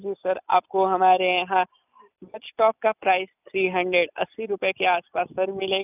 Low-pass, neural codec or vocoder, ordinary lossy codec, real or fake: 3.6 kHz; none; none; real